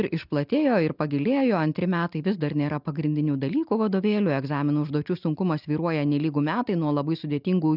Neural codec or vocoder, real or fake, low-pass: none; real; 5.4 kHz